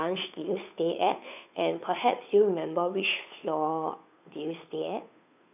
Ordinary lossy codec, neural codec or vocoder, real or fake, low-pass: none; codec, 16 kHz, 2 kbps, FunCodec, trained on Chinese and English, 25 frames a second; fake; 3.6 kHz